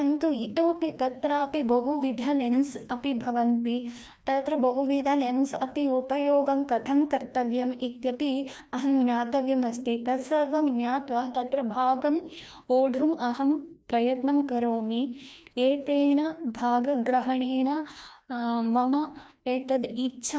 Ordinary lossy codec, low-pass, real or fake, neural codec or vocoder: none; none; fake; codec, 16 kHz, 1 kbps, FreqCodec, larger model